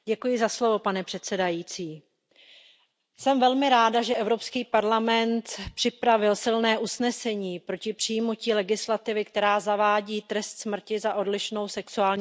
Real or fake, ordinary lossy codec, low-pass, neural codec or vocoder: real; none; none; none